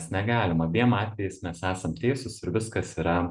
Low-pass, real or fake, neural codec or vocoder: 10.8 kHz; real; none